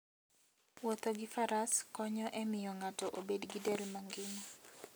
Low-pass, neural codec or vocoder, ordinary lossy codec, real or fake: none; none; none; real